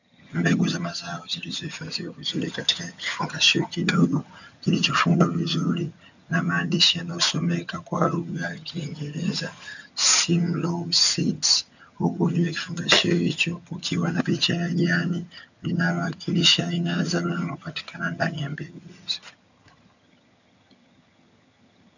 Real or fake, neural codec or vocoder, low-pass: fake; vocoder, 22.05 kHz, 80 mel bands, HiFi-GAN; 7.2 kHz